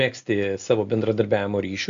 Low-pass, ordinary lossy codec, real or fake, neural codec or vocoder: 7.2 kHz; AAC, 64 kbps; real; none